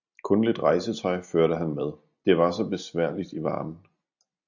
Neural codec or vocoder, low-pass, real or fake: none; 7.2 kHz; real